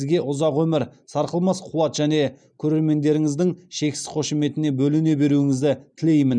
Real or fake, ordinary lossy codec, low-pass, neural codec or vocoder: real; none; 9.9 kHz; none